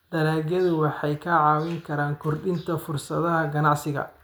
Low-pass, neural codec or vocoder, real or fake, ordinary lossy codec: none; none; real; none